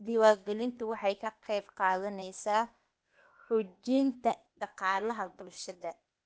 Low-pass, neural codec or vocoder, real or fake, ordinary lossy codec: none; codec, 16 kHz, 0.8 kbps, ZipCodec; fake; none